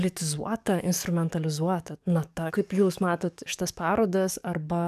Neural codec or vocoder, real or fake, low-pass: codec, 44.1 kHz, 7.8 kbps, DAC; fake; 14.4 kHz